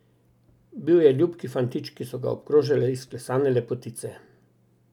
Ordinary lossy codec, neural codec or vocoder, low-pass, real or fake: none; none; 19.8 kHz; real